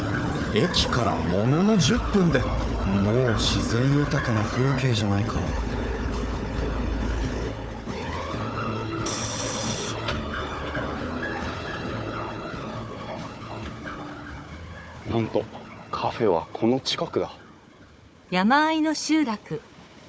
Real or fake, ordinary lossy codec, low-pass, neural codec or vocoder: fake; none; none; codec, 16 kHz, 4 kbps, FunCodec, trained on Chinese and English, 50 frames a second